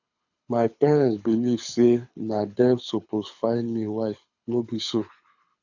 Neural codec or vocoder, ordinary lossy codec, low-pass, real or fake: codec, 24 kHz, 6 kbps, HILCodec; none; 7.2 kHz; fake